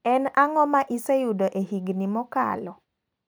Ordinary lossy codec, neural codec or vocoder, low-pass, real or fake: none; none; none; real